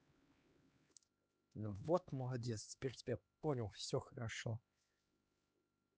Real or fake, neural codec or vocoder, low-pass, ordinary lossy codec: fake; codec, 16 kHz, 2 kbps, X-Codec, HuBERT features, trained on LibriSpeech; none; none